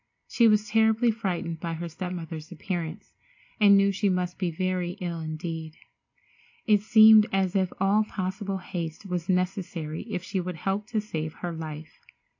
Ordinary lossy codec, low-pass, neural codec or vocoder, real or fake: AAC, 48 kbps; 7.2 kHz; none; real